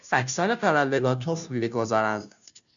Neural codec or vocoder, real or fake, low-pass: codec, 16 kHz, 0.5 kbps, FunCodec, trained on Chinese and English, 25 frames a second; fake; 7.2 kHz